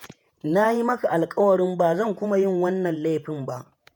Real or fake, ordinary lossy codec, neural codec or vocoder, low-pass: fake; none; vocoder, 48 kHz, 128 mel bands, Vocos; none